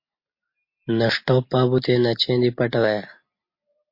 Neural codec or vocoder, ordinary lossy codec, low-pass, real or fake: none; MP3, 32 kbps; 5.4 kHz; real